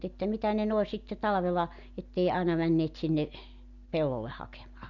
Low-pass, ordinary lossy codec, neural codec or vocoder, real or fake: 7.2 kHz; Opus, 64 kbps; none; real